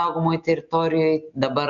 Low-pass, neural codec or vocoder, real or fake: 7.2 kHz; none; real